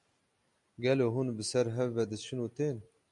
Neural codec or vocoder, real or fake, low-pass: none; real; 10.8 kHz